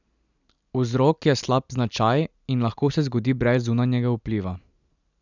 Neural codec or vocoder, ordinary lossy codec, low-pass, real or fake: none; none; 7.2 kHz; real